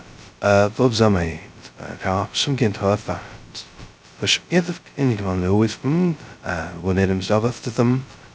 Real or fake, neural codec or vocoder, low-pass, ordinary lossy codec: fake; codec, 16 kHz, 0.2 kbps, FocalCodec; none; none